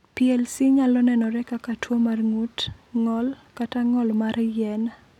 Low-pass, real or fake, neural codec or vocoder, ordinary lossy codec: 19.8 kHz; real; none; none